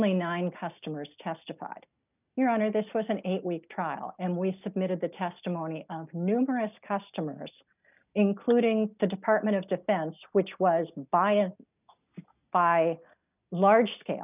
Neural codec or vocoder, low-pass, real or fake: none; 3.6 kHz; real